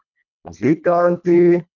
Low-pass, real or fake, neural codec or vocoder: 7.2 kHz; fake; codec, 24 kHz, 1.5 kbps, HILCodec